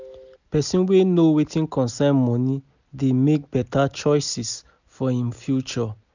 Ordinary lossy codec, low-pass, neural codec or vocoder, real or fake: none; 7.2 kHz; none; real